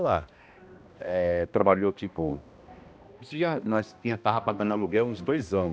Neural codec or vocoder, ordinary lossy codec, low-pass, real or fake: codec, 16 kHz, 1 kbps, X-Codec, HuBERT features, trained on balanced general audio; none; none; fake